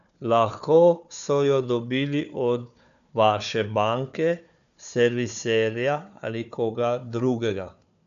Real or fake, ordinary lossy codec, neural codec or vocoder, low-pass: fake; none; codec, 16 kHz, 4 kbps, FunCodec, trained on Chinese and English, 50 frames a second; 7.2 kHz